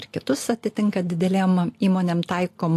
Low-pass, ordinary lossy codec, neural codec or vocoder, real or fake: 14.4 kHz; AAC, 64 kbps; none; real